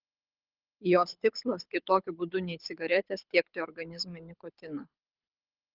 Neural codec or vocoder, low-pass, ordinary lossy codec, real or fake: codec, 24 kHz, 6 kbps, HILCodec; 5.4 kHz; Opus, 32 kbps; fake